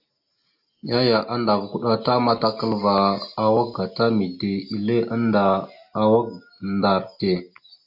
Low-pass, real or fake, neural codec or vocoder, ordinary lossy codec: 5.4 kHz; real; none; AAC, 48 kbps